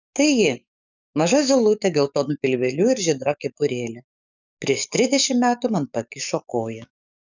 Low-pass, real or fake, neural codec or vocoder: 7.2 kHz; fake; codec, 44.1 kHz, 7.8 kbps, DAC